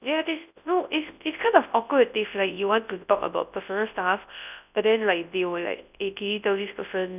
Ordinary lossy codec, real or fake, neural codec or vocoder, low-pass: none; fake; codec, 24 kHz, 0.9 kbps, WavTokenizer, large speech release; 3.6 kHz